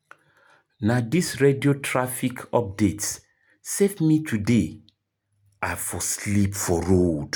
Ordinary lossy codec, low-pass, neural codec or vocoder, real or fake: none; none; none; real